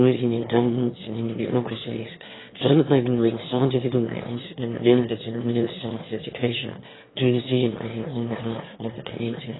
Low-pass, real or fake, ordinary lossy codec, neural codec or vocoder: 7.2 kHz; fake; AAC, 16 kbps; autoencoder, 22.05 kHz, a latent of 192 numbers a frame, VITS, trained on one speaker